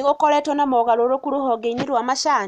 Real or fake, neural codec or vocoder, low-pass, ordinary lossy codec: real; none; 14.4 kHz; none